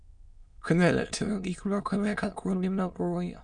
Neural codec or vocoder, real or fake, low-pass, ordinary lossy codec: autoencoder, 22.05 kHz, a latent of 192 numbers a frame, VITS, trained on many speakers; fake; 9.9 kHz; none